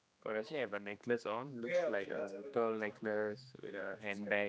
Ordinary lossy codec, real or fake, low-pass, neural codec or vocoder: none; fake; none; codec, 16 kHz, 2 kbps, X-Codec, HuBERT features, trained on general audio